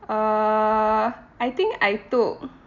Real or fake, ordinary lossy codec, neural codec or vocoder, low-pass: real; none; none; 7.2 kHz